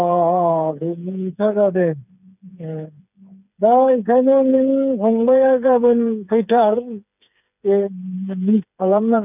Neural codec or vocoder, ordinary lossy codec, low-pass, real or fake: codec, 16 kHz, 4 kbps, FreqCodec, smaller model; none; 3.6 kHz; fake